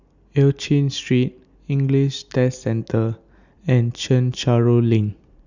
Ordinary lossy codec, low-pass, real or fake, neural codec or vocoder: Opus, 64 kbps; 7.2 kHz; real; none